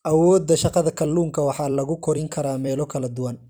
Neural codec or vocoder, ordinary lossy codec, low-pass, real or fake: vocoder, 44.1 kHz, 128 mel bands every 256 samples, BigVGAN v2; none; none; fake